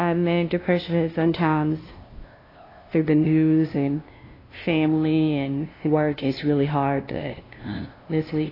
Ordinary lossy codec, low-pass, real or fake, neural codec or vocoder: AAC, 24 kbps; 5.4 kHz; fake; codec, 16 kHz, 0.5 kbps, FunCodec, trained on LibriTTS, 25 frames a second